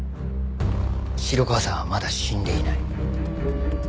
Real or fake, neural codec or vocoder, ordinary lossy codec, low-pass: real; none; none; none